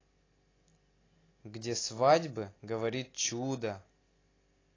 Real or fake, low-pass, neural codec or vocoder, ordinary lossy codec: real; 7.2 kHz; none; AAC, 32 kbps